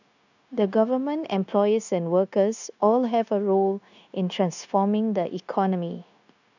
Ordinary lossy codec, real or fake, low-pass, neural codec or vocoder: none; fake; 7.2 kHz; codec, 16 kHz, 0.9 kbps, LongCat-Audio-Codec